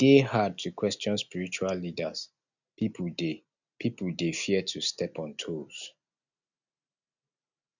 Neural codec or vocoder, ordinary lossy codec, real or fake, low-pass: none; none; real; 7.2 kHz